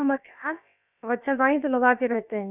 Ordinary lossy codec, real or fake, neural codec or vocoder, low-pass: none; fake; codec, 16 kHz, about 1 kbps, DyCAST, with the encoder's durations; 3.6 kHz